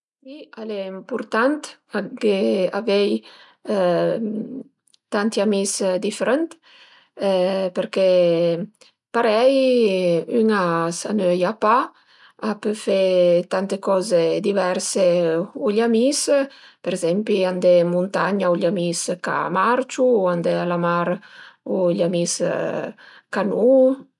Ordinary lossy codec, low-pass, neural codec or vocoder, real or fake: none; 10.8 kHz; none; real